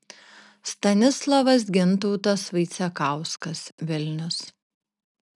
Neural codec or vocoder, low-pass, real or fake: none; 10.8 kHz; real